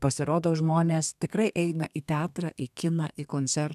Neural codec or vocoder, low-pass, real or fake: codec, 32 kHz, 1.9 kbps, SNAC; 14.4 kHz; fake